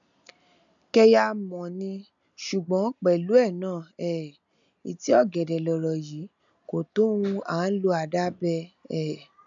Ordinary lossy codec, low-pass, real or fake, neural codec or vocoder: none; 7.2 kHz; real; none